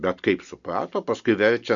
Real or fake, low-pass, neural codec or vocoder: real; 7.2 kHz; none